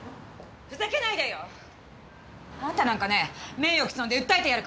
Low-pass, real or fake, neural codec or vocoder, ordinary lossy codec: none; real; none; none